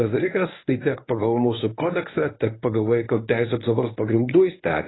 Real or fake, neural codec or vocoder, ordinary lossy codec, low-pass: fake; codec, 24 kHz, 0.9 kbps, WavTokenizer, medium speech release version 1; AAC, 16 kbps; 7.2 kHz